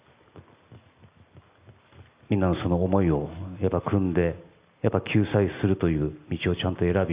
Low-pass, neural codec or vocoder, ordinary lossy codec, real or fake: 3.6 kHz; none; Opus, 64 kbps; real